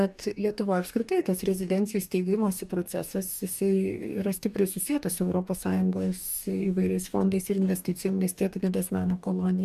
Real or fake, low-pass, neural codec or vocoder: fake; 14.4 kHz; codec, 44.1 kHz, 2.6 kbps, DAC